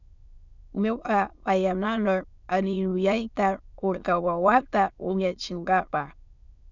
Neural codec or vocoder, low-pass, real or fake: autoencoder, 22.05 kHz, a latent of 192 numbers a frame, VITS, trained on many speakers; 7.2 kHz; fake